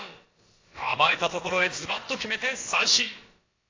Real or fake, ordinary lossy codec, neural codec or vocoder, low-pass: fake; AAC, 32 kbps; codec, 16 kHz, about 1 kbps, DyCAST, with the encoder's durations; 7.2 kHz